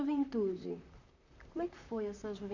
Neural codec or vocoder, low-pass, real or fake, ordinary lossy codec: vocoder, 44.1 kHz, 128 mel bands, Pupu-Vocoder; 7.2 kHz; fake; none